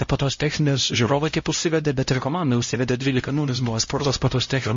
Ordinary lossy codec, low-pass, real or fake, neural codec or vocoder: MP3, 32 kbps; 7.2 kHz; fake; codec, 16 kHz, 0.5 kbps, X-Codec, HuBERT features, trained on LibriSpeech